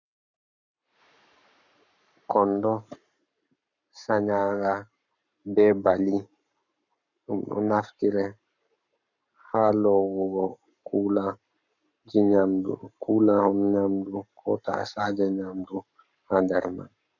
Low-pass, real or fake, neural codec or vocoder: 7.2 kHz; fake; codec, 44.1 kHz, 7.8 kbps, Pupu-Codec